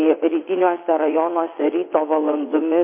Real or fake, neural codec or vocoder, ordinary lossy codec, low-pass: fake; vocoder, 22.05 kHz, 80 mel bands, WaveNeXt; MP3, 24 kbps; 3.6 kHz